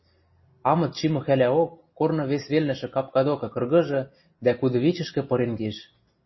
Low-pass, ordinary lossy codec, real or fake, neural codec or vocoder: 7.2 kHz; MP3, 24 kbps; real; none